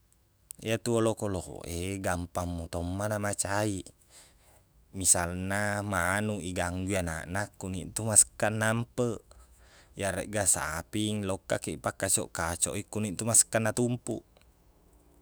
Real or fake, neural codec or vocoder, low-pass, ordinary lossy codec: fake; autoencoder, 48 kHz, 128 numbers a frame, DAC-VAE, trained on Japanese speech; none; none